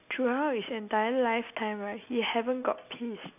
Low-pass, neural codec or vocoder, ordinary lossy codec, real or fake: 3.6 kHz; none; none; real